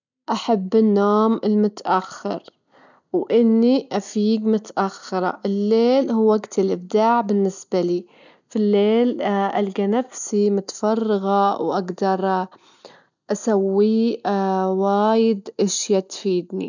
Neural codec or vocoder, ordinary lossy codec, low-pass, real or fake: none; none; 7.2 kHz; real